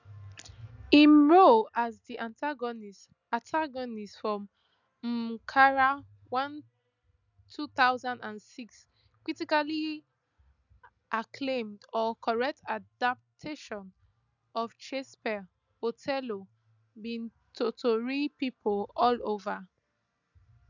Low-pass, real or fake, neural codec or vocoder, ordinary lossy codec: 7.2 kHz; real; none; none